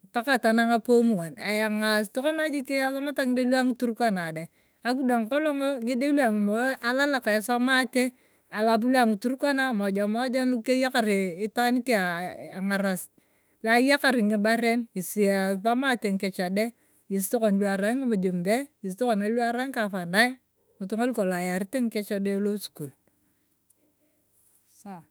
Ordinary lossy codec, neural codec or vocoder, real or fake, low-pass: none; autoencoder, 48 kHz, 32 numbers a frame, DAC-VAE, trained on Japanese speech; fake; none